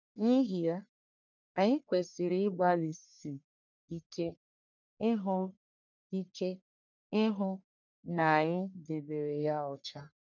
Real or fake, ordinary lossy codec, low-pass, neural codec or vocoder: fake; none; 7.2 kHz; codec, 44.1 kHz, 1.7 kbps, Pupu-Codec